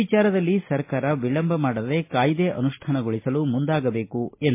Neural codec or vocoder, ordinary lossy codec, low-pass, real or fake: none; MP3, 24 kbps; 3.6 kHz; real